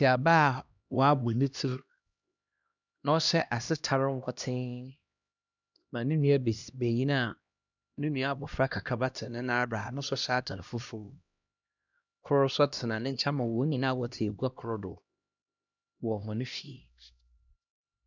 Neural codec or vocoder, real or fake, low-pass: codec, 16 kHz, 1 kbps, X-Codec, HuBERT features, trained on LibriSpeech; fake; 7.2 kHz